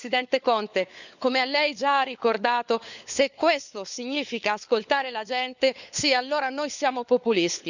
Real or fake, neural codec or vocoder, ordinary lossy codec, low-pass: fake; codec, 16 kHz, 4 kbps, FunCodec, trained on LibriTTS, 50 frames a second; none; 7.2 kHz